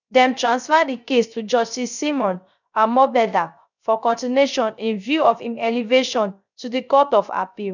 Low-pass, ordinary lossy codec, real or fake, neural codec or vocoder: 7.2 kHz; none; fake; codec, 16 kHz, 0.3 kbps, FocalCodec